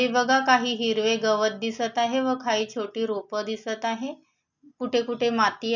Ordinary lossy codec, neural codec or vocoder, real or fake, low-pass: none; none; real; 7.2 kHz